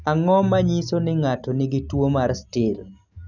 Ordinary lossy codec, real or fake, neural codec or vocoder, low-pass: none; real; none; 7.2 kHz